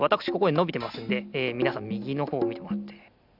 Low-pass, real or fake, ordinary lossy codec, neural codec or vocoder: 5.4 kHz; real; none; none